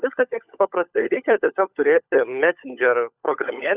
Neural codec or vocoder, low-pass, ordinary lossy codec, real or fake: codec, 16 kHz, 16 kbps, FunCodec, trained on LibriTTS, 50 frames a second; 3.6 kHz; Opus, 64 kbps; fake